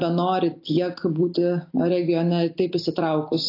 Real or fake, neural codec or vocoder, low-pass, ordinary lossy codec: real; none; 5.4 kHz; AAC, 32 kbps